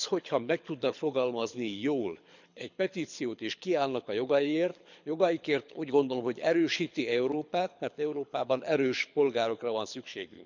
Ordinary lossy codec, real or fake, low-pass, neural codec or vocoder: none; fake; 7.2 kHz; codec, 24 kHz, 6 kbps, HILCodec